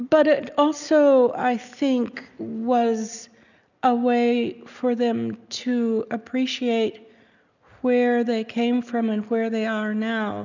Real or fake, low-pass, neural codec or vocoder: real; 7.2 kHz; none